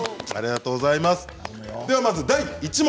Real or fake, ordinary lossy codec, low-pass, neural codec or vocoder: real; none; none; none